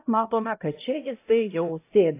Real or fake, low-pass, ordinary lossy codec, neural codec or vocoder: fake; 3.6 kHz; AAC, 24 kbps; codec, 16 kHz, 0.5 kbps, X-Codec, HuBERT features, trained on LibriSpeech